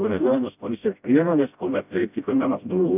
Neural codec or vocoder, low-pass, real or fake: codec, 16 kHz, 0.5 kbps, FreqCodec, smaller model; 3.6 kHz; fake